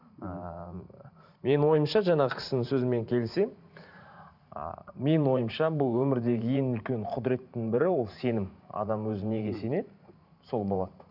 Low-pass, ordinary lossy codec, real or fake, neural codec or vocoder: 5.4 kHz; none; fake; vocoder, 44.1 kHz, 128 mel bands every 512 samples, BigVGAN v2